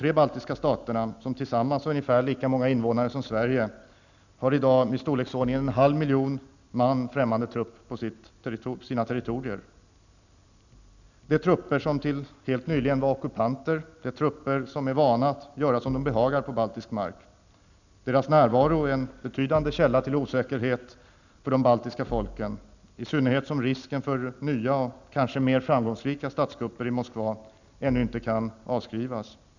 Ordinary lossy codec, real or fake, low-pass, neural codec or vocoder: none; real; 7.2 kHz; none